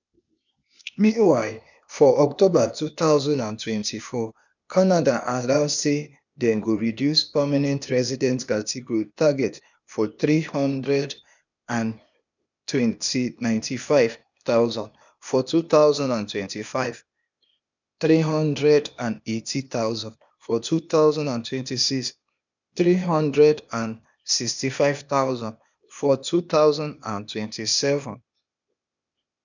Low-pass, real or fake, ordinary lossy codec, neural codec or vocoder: 7.2 kHz; fake; none; codec, 16 kHz, 0.8 kbps, ZipCodec